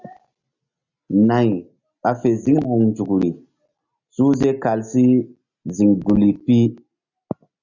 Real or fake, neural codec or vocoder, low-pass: real; none; 7.2 kHz